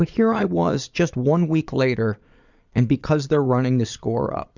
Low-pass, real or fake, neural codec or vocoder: 7.2 kHz; fake; vocoder, 22.05 kHz, 80 mel bands, Vocos